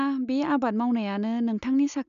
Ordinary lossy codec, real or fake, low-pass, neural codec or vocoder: none; real; 7.2 kHz; none